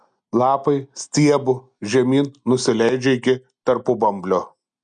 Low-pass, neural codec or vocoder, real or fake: 9.9 kHz; none; real